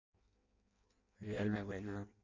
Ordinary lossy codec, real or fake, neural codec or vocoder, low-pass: MP3, 48 kbps; fake; codec, 16 kHz in and 24 kHz out, 0.6 kbps, FireRedTTS-2 codec; 7.2 kHz